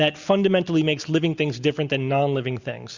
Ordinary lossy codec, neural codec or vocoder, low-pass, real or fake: Opus, 64 kbps; none; 7.2 kHz; real